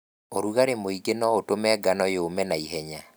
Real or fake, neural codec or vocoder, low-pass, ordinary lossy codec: real; none; none; none